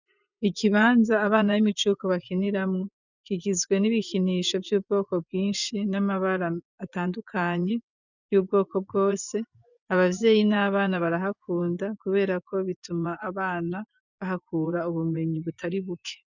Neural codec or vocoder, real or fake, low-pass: vocoder, 24 kHz, 100 mel bands, Vocos; fake; 7.2 kHz